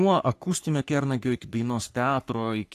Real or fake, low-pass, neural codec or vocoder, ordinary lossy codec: fake; 14.4 kHz; codec, 44.1 kHz, 3.4 kbps, Pupu-Codec; AAC, 64 kbps